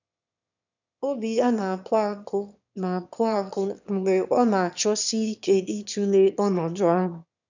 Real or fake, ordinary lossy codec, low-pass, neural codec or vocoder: fake; none; 7.2 kHz; autoencoder, 22.05 kHz, a latent of 192 numbers a frame, VITS, trained on one speaker